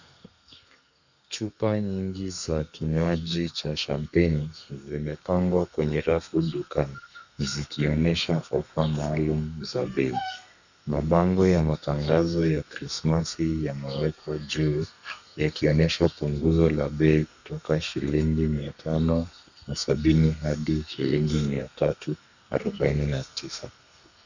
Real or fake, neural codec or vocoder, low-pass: fake; codec, 32 kHz, 1.9 kbps, SNAC; 7.2 kHz